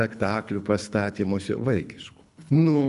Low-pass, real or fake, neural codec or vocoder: 10.8 kHz; fake; codec, 24 kHz, 3 kbps, HILCodec